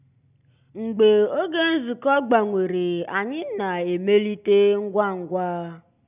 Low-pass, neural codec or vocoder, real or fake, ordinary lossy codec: 3.6 kHz; none; real; none